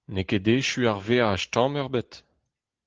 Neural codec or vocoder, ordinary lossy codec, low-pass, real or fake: none; Opus, 16 kbps; 7.2 kHz; real